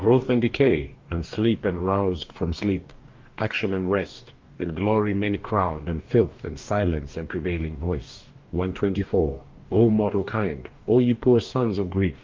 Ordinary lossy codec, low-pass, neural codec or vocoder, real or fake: Opus, 32 kbps; 7.2 kHz; codec, 44.1 kHz, 2.6 kbps, DAC; fake